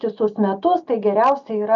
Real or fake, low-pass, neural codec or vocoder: real; 7.2 kHz; none